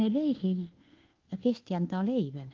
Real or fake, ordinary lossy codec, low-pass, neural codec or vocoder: fake; Opus, 16 kbps; 7.2 kHz; codec, 24 kHz, 1.2 kbps, DualCodec